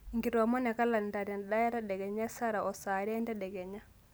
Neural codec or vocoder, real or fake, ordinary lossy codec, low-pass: none; real; none; none